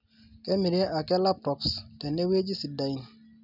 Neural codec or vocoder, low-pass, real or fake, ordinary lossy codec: none; 5.4 kHz; real; none